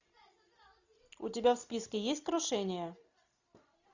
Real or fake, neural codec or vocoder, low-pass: real; none; 7.2 kHz